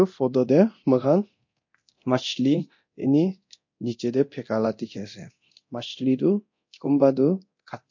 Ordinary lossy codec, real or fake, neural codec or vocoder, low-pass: MP3, 48 kbps; fake; codec, 24 kHz, 0.9 kbps, DualCodec; 7.2 kHz